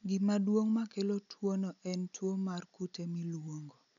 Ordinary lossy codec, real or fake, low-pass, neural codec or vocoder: none; real; 7.2 kHz; none